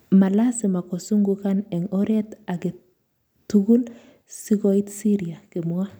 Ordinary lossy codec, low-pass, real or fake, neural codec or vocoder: none; none; real; none